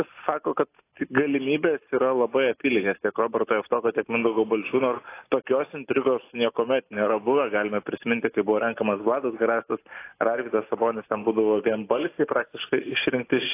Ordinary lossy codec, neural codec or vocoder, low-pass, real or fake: AAC, 24 kbps; none; 3.6 kHz; real